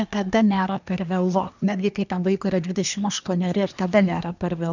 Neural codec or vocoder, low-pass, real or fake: codec, 24 kHz, 1 kbps, SNAC; 7.2 kHz; fake